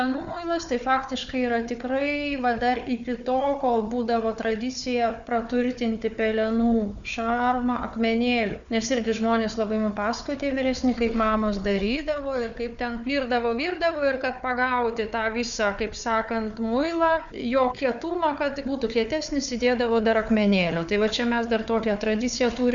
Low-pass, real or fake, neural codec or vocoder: 7.2 kHz; fake; codec, 16 kHz, 4 kbps, FunCodec, trained on Chinese and English, 50 frames a second